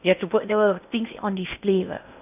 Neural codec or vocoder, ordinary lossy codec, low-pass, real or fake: codec, 16 kHz in and 24 kHz out, 0.6 kbps, FocalCodec, streaming, 4096 codes; none; 3.6 kHz; fake